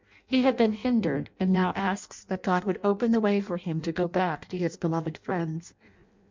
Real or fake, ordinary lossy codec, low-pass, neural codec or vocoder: fake; MP3, 64 kbps; 7.2 kHz; codec, 16 kHz in and 24 kHz out, 0.6 kbps, FireRedTTS-2 codec